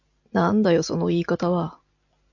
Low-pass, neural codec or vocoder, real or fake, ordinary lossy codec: 7.2 kHz; none; real; AAC, 48 kbps